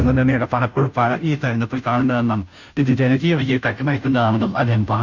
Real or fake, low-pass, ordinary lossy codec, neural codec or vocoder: fake; 7.2 kHz; none; codec, 16 kHz, 0.5 kbps, FunCodec, trained on Chinese and English, 25 frames a second